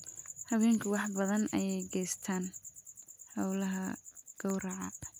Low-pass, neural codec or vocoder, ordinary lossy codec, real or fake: none; none; none; real